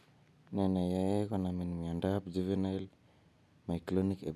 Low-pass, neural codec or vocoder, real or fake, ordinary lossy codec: none; none; real; none